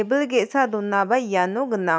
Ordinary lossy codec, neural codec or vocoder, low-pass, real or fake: none; none; none; real